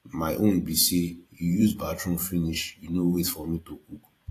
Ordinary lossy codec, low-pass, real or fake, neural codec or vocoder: AAC, 48 kbps; 14.4 kHz; fake; vocoder, 44.1 kHz, 128 mel bands every 512 samples, BigVGAN v2